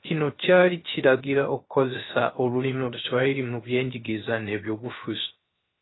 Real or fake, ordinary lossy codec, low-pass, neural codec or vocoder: fake; AAC, 16 kbps; 7.2 kHz; codec, 16 kHz, 0.3 kbps, FocalCodec